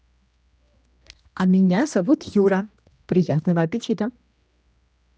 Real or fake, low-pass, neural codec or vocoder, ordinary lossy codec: fake; none; codec, 16 kHz, 1 kbps, X-Codec, HuBERT features, trained on general audio; none